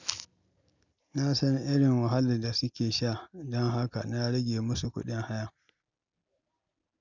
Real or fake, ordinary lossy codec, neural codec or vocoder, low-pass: real; none; none; 7.2 kHz